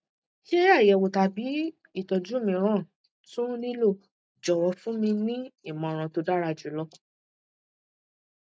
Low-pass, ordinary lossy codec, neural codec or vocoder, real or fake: none; none; none; real